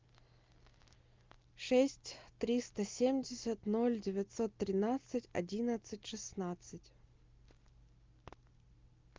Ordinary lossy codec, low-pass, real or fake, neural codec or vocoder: Opus, 24 kbps; 7.2 kHz; real; none